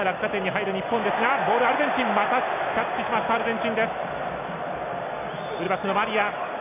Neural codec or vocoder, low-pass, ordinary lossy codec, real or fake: none; 3.6 kHz; none; real